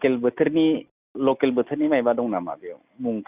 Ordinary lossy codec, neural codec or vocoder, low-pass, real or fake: Opus, 64 kbps; none; 3.6 kHz; real